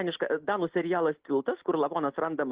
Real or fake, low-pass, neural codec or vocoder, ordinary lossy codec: real; 3.6 kHz; none; Opus, 16 kbps